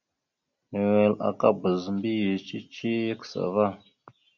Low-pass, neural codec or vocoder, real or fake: 7.2 kHz; none; real